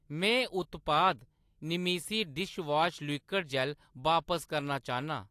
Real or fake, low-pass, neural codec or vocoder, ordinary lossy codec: real; 14.4 kHz; none; AAC, 64 kbps